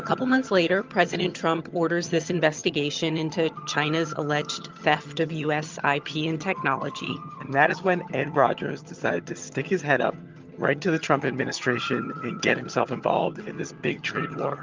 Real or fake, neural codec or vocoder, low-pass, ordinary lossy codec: fake; vocoder, 22.05 kHz, 80 mel bands, HiFi-GAN; 7.2 kHz; Opus, 24 kbps